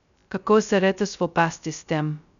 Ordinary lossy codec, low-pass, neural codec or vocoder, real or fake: none; 7.2 kHz; codec, 16 kHz, 0.2 kbps, FocalCodec; fake